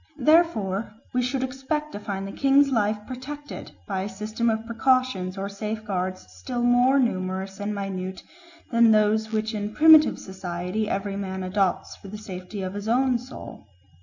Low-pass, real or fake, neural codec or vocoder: 7.2 kHz; real; none